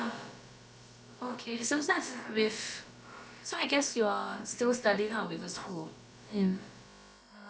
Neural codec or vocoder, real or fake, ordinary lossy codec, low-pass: codec, 16 kHz, about 1 kbps, DyCAST, with the encoder's durations; fake; none; none